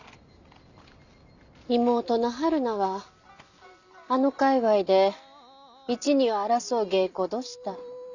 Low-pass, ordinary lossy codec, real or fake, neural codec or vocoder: 7.2 kHz; none; real; none